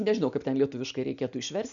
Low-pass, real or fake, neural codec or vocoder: 7.2 kHz; real; none